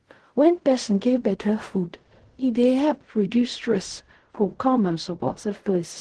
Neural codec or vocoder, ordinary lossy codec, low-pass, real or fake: codec, 16 kHz in and 24 kHz out, 0.4 kbps, LongCat-Audio-Codec, fine tuned four codebook decoder; Opus, 16 kbps; 10.8 kHz; fake